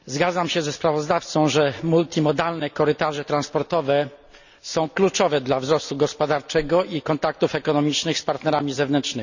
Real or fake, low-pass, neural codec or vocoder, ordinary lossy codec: real; 7.2 kHz; none; none